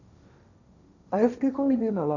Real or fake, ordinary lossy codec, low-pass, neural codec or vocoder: fake; none; 7.2 kHz; codec, 16 kHz, 1.1 kbps, Voila-Tokenizer